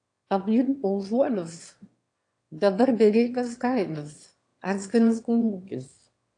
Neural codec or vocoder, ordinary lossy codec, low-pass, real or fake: autoencoder, 22.05 kHz, a latent of 192 numbers a frame, VITS, trained on one speaker; AAC, 48 kbps; 9.9 kHz; fake